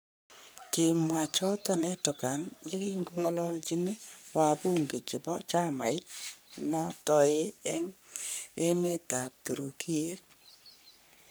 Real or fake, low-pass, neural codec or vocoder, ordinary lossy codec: fake; none; codec, 44.1 kHz, 3.4 kbps, Pupu-Codec; none